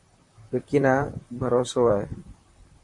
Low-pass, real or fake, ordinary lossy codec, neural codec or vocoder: 10.8 kHz; fake; MP3, 48 kbps; vocoder, 44.1 kHz, 128 mel bands, Pupu-Vocoder